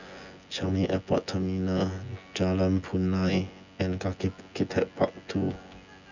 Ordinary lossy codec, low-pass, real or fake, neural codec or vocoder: none; 7.2 kHz; fake; vocoder, 24 kHz, 100 mel bands, Vocos